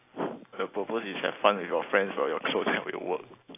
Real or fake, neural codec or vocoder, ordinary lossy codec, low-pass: real; none; AAC, 24 kbps; 3.6 kHz